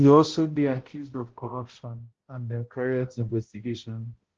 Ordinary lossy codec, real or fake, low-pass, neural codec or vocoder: Opus, 16 kbps; fake; 7.2 kHz; codec, 16 kHz, 0.5 kbps, X-Codec, HuBERT features, trained on balanced general audio